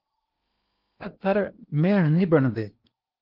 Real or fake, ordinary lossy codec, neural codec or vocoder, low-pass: fake; Opus, 24 kbps; codec, 16 kHz in and 24 kHz out, 0.8 kbps, FocalCodec, streaming, 65536 codes; 5.4 kHz